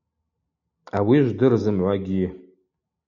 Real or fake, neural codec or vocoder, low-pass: real; none; 7.2 kHz